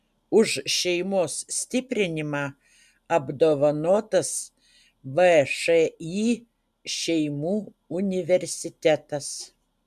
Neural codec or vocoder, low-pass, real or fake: none; 14.4 kHz; real